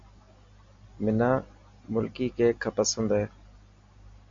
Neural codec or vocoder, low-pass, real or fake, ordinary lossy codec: none; 7.2 kHz; real; MP3, 48 kbps